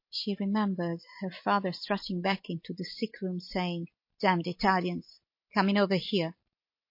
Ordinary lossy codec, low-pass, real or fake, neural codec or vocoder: MP3, 32 kbps; 5.4 kHz; real; none